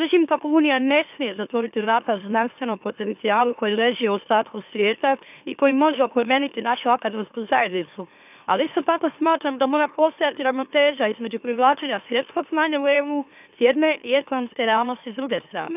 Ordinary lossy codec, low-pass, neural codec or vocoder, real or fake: none; 3.6 kHz; autoencoder, 44.1 kHz, a latent of 192 numbers a frame, MeloTTS; fake